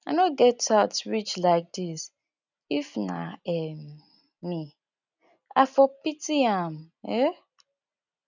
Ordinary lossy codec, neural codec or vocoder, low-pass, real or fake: none; none; 7.2 kHz; real